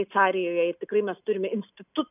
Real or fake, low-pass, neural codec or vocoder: real; 3.6 kHz; none